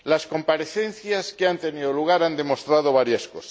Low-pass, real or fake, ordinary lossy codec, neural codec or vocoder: none; real; none; none